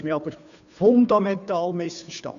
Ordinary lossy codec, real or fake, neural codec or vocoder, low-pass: none; fake; codec, 16 kHz, 2 kbps, FunCodec, trained on Chinese and English, 25 frames a second; 7.2 kHz